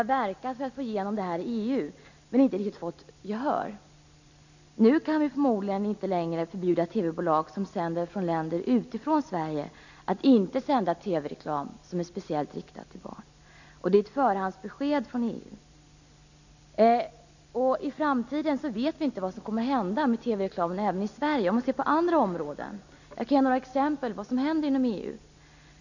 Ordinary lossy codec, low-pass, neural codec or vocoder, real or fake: none; 7.2 kHz; none; real